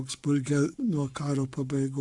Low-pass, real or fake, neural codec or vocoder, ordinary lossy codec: 10.8 kHz; fake; codec, 44.1 kHz, 7.8 kbps, Pupu-Codec; Opus, 64 kbps